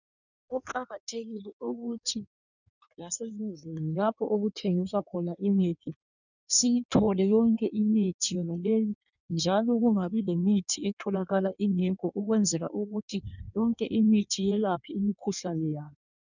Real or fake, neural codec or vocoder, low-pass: fake; codec, 16 kHz in and 24 kHz out, 1.1 kbps, FireRedTTS-2 codec; 7.2 kHz